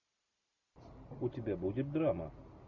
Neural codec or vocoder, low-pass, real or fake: none; 7.2 kHz; real